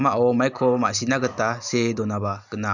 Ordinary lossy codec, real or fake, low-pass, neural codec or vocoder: none; real; 7.2 kHz; none